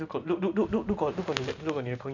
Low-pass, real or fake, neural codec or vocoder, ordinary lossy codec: 7.2 kHz; fake; vocoder, 44.1 kHz, 128 mel bands every 512 samples, BigVGAN v2; none